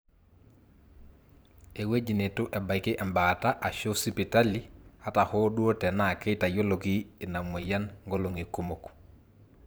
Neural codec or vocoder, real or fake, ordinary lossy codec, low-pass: vocoder, 44.1 kHz, 128 mel bands every 512 samples, BigVGAN v2; fake; none; none